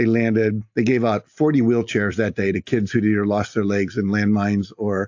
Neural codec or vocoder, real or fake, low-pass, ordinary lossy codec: none; real; 7.2 kHz; AAC, 48 kbps